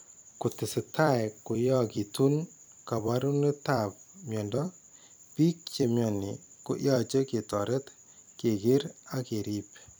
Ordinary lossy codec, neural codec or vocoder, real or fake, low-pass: none; vocoder, 44.1 kHz, 128 mel bands every 256 samples, BigVGAN v2; fake; none